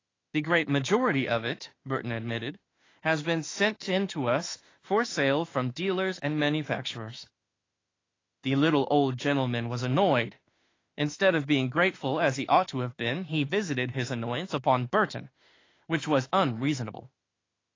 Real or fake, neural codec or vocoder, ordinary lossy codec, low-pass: fake; autoencoder, 48 kHz, 32 numbers a frame, DAC-VAE, trained on Japanese speech; AAC, 32 kbps; 7.2 kHz